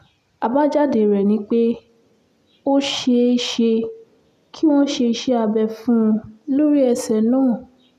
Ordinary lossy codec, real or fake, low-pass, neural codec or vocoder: none; real; 14.4 kHz; none